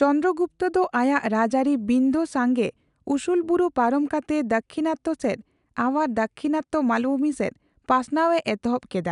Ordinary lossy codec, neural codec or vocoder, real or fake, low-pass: none; none; real; 10.8 kHz